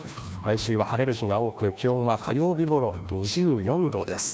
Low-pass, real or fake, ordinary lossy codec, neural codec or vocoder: none; fake; none; codec, 16 kHz, 1 kbps, FreqCodec, larger model